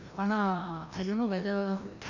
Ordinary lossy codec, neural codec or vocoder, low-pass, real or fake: none; codec, 16 kHz, 1 kbps, FreqCodec, larger model; 7.2 kHz; fake